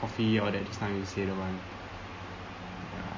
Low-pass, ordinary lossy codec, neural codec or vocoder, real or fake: 7.2 kHz; MP3, 32 kbps; none; real